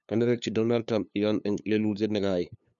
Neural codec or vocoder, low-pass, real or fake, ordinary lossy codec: codec, 16 kHz, 2 kbps, FunCodec, trained on LibriTTS, 25 frames a second; 7.2 kHz; fake; none